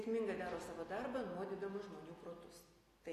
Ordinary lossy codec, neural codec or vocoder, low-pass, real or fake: AAC, 48 kbps; none; 14.4 kHz; real